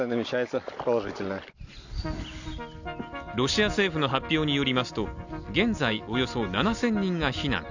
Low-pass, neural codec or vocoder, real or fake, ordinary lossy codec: 7.2 kHz; none; real; none